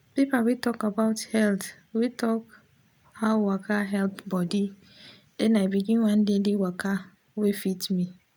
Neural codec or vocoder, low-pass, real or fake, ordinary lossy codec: none; none; real; none